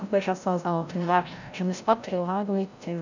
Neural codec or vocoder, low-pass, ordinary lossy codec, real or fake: codec, 16 kHz, 0.5 kbps, FreqCodec, larger model; 7.2 kHz; none; fake